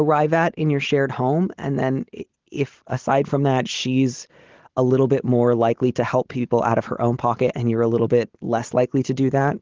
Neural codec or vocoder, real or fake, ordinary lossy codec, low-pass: none; real; Opus, 16 kbps; 7.2 kHz